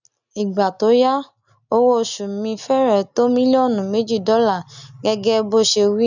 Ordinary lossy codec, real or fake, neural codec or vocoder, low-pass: none; real; none; 7.2 kHz